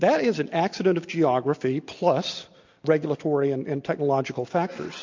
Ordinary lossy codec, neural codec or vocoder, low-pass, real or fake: MP3, 48 kbps; none; 7.2 kHz; real